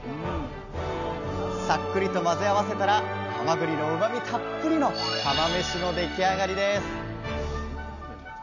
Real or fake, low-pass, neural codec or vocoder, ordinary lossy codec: real; 7.2 kHz; none; none